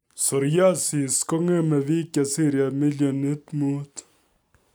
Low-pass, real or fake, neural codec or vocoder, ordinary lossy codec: none; real; none; none